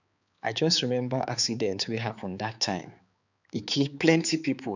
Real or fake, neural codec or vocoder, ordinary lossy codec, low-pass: fake; codec, 16 kHz, 4 kbps, X-Codec, HuBERT features, trained on balanced general audio; none; 7.2 kHz